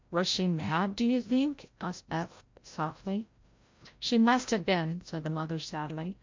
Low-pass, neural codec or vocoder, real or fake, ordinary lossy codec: 7.2 kHz; codec, 16 kHz, 0.5 kbps, FreqCodec, larger model; fake; MP3, 48 kbps